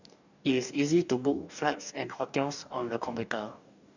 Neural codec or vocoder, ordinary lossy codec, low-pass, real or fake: codec, 44.1 kHz, 2.6 kbps, DAC; none; 7.2 kHz; fake